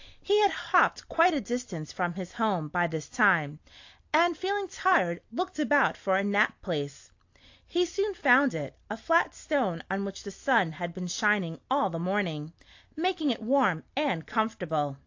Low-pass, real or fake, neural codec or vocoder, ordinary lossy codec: 7.2 kHz; real; none; AAC, 48 kbps